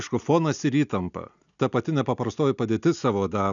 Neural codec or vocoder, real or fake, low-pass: none; real; 7.2 kHz